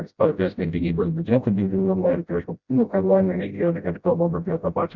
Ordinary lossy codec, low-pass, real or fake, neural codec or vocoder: Opus, 64 kbps; 7.2 kHz; fake; codec, 16 kHz, 0.5 kbps, FreqCodec, smaller model